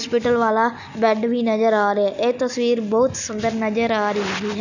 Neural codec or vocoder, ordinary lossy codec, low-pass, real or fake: none; none; 7.2 kHz; real